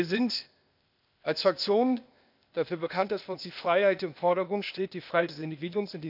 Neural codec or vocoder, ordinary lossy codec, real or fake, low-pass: codec, 16 kHz, 0.8 kbps, ZipCodec; none; fake; 5.4 kHz